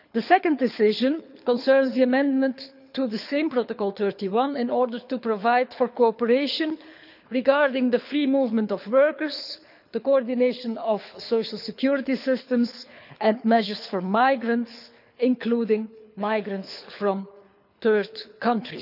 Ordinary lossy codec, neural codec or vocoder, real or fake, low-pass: none; codec, 24 kHz, 6 kbps, HILCodec; fake; 5.4 kHz